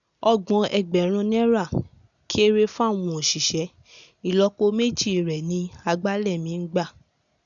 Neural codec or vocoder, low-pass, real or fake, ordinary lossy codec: none; 7.2 kHz; real; none